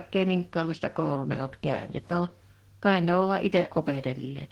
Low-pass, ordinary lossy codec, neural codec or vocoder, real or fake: 19.8 kHz; Opus, 16 kbps; codec, 44.1 kHz, 2.6 kbps, DAC; fake